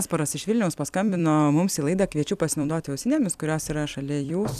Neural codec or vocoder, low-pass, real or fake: vocoder, 44.1 kHz, 128 mel bands every 256 samples, BigVGAN v2; 14.4 kHz; fake